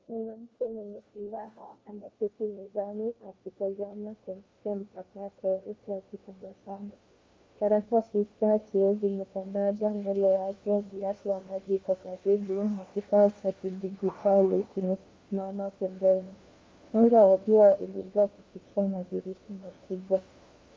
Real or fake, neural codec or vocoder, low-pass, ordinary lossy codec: fake; codec, 16 kHz, 1 kbps, FunCodec, trained on LibriTTS, 50 frames a second; 7.2 kHz; Opus, 32 kbps